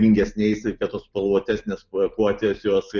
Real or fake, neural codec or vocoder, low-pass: real; none; 7.2 kHz